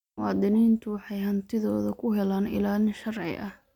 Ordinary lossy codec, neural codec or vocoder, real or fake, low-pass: none; none; real; 19.8 kHz